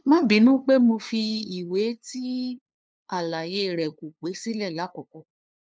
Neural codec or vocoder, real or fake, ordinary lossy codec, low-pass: codec, 16 kHz, 2 kbps, FunCodec, trained on LibriTTS, 25 frames a second; fake; none; none